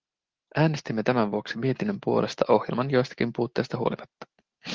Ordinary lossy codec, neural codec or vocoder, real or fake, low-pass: Opus, 16 kbps; none; real; 7.2 kHz